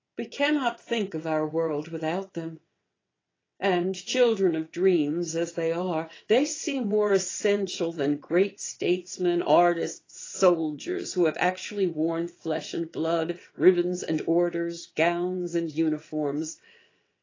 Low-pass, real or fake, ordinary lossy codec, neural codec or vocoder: 7.2 kHz; fake; AAC, 32 kbps; vocoder, 22.05 kHz, 80 mel bands, WaveNeXt